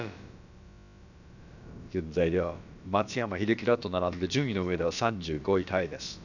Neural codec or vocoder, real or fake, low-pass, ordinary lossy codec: codec, 16 kHz, about 1 kbps, DyCAST, with the encoder's durations; fake; 7.2 kHz; none